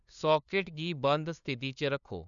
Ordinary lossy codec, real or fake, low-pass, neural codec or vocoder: none; fake; 7.2 kHz; codec, 16 kHz, 2 kbps, FunCodec, trained on LibriTTS, 25 frames a second